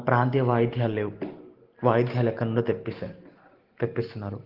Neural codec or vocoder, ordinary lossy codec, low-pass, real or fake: none; Opus, 16 kbps; 5.4 kHz; real